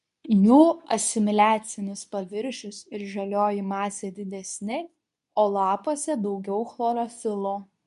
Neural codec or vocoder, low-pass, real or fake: codec, 24 kHz, 0.9 kbps, WavTokenizer, medium speech release version 2; 10.8 kHz; fake